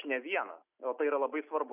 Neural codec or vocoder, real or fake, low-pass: none; real; 3.6 kHz